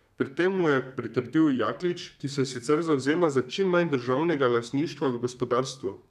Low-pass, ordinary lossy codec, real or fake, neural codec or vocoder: 14.4 kHz; none; fake; codec, 32 kHz, 1.9 kbps, SNAC